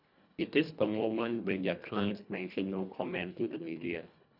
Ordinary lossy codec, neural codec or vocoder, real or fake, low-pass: none; codec, 24 kHz, 1.5 kbps, HILCodec; fake; 5.4 kHz